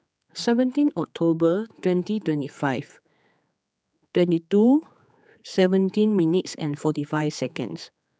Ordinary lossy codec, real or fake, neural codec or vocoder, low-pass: none; fake; codec, 16 kHz, 4 kbps, X-Codec, HuBERT features, trained on general audio; none